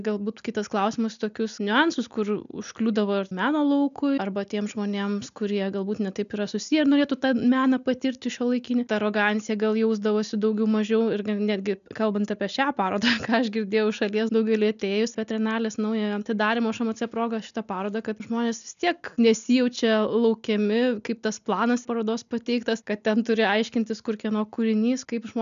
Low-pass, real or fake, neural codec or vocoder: 7.2 kHz; real; none